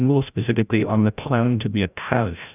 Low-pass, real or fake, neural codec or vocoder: 3.6 kHz; fake; codec, 16 kHz, 0.5 kbps, FreqCodec, larger model